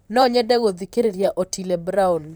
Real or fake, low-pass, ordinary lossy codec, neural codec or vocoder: fake; none; none; vocoder, 44.1 kHz, 128 mel bands, Pupu-Vocoder